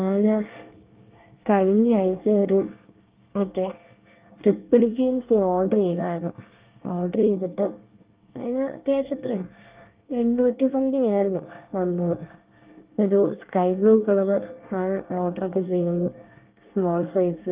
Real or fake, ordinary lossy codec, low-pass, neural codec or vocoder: fake; Opus, 32 kbps; 3.6 kHz; codec, 24 kHz, 1 kbps, SNAC